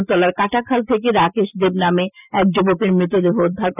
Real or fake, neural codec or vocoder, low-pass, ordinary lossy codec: real; none; 3.6 kHz; none